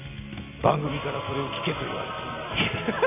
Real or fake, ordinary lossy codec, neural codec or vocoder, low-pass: real; none; none; 3.6 kHz